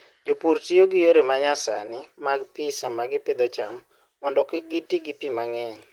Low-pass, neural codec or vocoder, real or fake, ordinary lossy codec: 19.8 kHz; vocoder, 44.1 kHz, 128 mel bands, Pupu-Vocoder; fake; Opus, 24 kbps